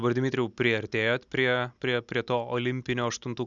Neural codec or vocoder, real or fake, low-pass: none; real; 7.2 kHz